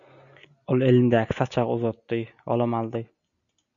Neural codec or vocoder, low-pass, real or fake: none; 7.2 kHz; real